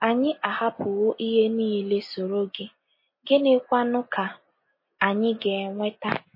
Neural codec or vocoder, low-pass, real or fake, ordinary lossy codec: none; 5.4 kHz; real; MP3, 24 kbps